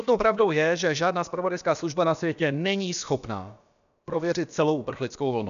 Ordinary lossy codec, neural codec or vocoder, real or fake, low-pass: MP3, 96 kbps; codec, 16 kHz, about 1 kbps, DyCAST, with the encoder's durations; fake; 7.2 kHz